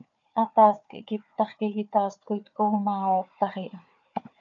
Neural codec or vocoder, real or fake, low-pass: codec, 16 kHz, 4 kbps, FunCodec, trained on Chinese and English, 50 frames a second; fake; 7.2 kHz